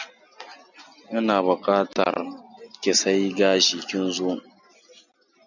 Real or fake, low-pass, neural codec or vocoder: real; 7.2 kHz; none